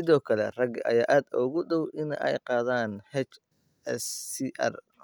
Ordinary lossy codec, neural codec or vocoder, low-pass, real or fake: none; none; none; real